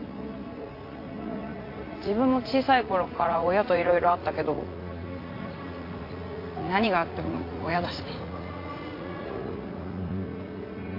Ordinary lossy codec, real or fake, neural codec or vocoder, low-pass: AAC, 32 kbps; fake; vocoder, 44.1 kHz, 80 mel bands, Vocos; 5.4 kHz